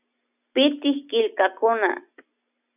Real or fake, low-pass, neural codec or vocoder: real; 3.6 kHz; none